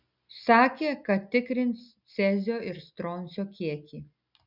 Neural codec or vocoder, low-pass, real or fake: none; 5.4 kHz; real